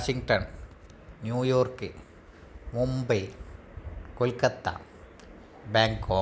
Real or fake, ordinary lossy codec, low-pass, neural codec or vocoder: real; none; none; none